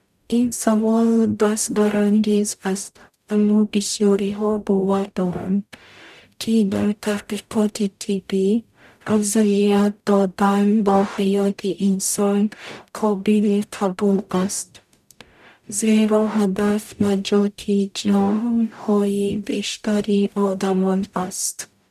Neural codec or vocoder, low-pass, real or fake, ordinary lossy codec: codec, 44.1 kHz, 0.9 kbps, DAC; 14.4 kHz; fake; none